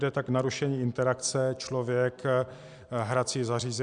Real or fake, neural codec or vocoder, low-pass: real; none; 9.9 kHz